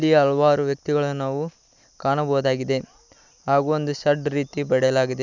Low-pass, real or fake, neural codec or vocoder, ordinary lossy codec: 7.2 kHz; real; none; none